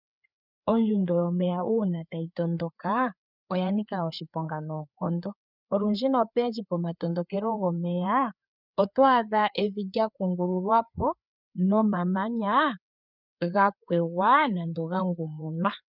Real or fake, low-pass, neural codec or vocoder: fake; 5.4 kHz; codec, 16 kHz, 4 kbps, FreqCodec, larger model